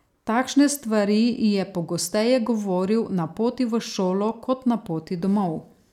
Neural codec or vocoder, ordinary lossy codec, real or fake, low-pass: vocoder, 44.1 kHz, 128 mel bands every 256 samples, BigVGAN v2; none; fake; 19.8 kHz